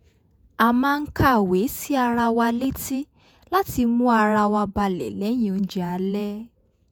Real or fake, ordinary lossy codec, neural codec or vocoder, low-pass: fake; none; vocoder, 48 kHz, 128 mel bands, Vocos; none